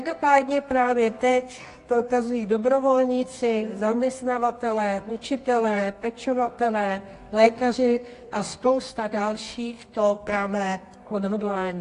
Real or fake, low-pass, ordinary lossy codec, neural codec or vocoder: fake; 10.8 kHz; AAC, 48 kbps; codec, 24 kHz, 0.9 kbps, WavTokenizer, medium music audio release